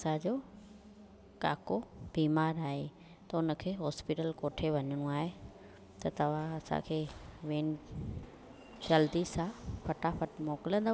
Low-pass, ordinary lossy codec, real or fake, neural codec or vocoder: none; none; real; none